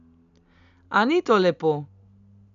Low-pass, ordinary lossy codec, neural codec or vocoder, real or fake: 7.2 kHz; none; none; real